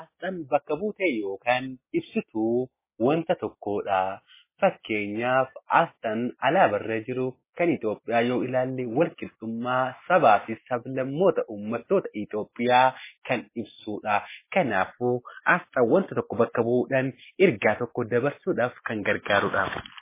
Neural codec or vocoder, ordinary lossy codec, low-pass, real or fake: none; MP3, 16 kbps; 3.6 kHz; real